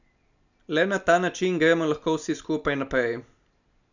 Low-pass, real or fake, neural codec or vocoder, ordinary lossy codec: 7.2 kHz; real; none; none